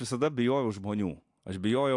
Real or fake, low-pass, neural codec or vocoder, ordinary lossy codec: real; 10.8 kHz; none; AAC, 64 kbps